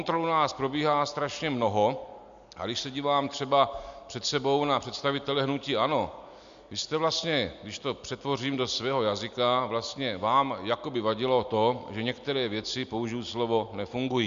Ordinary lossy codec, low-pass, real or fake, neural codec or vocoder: MP3, 64 kbps; 7.2 kHz; real; none